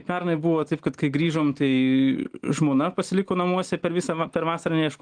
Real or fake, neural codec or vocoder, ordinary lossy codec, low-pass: real; none; Opus, 24 kbps; 9.9 kHz